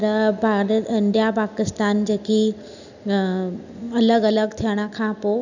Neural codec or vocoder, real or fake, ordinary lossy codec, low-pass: none; real; none; 7.2 kHz